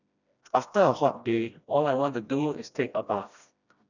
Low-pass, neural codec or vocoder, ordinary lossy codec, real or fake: 7.2 kHz; codec, 16 kHz, 1 kbps, FreqCodec, smaller model; none; fake